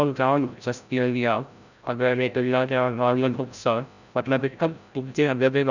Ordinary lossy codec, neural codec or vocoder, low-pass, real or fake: none; codec, 16 kHz, 0.5 kbps, FreqCodec, larger model; 7.2 kHz; fake